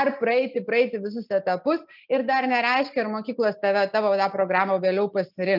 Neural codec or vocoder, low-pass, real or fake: none; 5.4 kHz; real